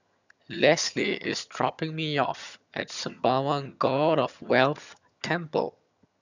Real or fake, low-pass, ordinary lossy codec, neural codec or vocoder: fake; 7.2 kHz; none; vocoder, 22.05 kHz, 80 mel bands, HiFi-GAN